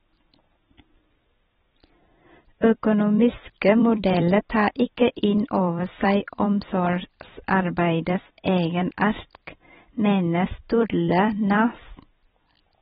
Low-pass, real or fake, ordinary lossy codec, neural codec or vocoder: 19.8 kHz; fake; AAC, 16 kbps; vocoder, 44.1 kHz, 128 mel bands every 256 samples, BigVGAN v2